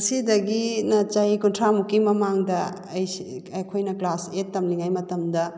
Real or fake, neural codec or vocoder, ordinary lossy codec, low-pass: real; none; none; none